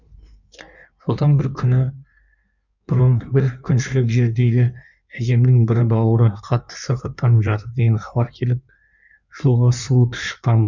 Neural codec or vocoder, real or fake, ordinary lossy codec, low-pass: codec, 16 kHz in and 24 kHz out, 1.1 kbps, FireRedTTS-2 codec; fake; none; 7.2 kHz